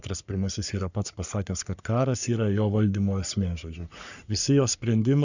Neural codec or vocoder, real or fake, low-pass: codec, 44.1 kHz, 3.4 kbps, Pupu-Codec; fake; 7.2 kHz